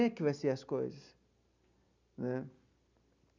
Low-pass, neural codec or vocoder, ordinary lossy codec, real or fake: 7.2 kHz; none; none; real